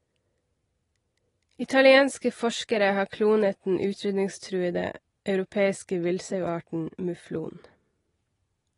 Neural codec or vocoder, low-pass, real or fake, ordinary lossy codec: none; 10.8 kHz; real; AAC, 32 kbps